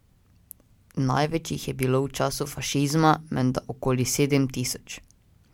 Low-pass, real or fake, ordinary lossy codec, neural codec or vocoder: 19.8 kHz; real; MP3, 96 kbps; none